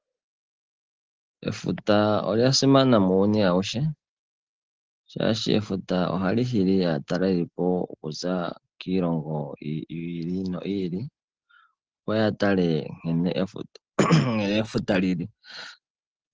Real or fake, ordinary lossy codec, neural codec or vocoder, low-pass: real; Opus, 16 kbps; none; 7.2 kHz